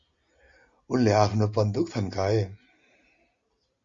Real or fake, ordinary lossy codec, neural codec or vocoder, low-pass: real; Opus, 64 kbps; none; 7.2 kHz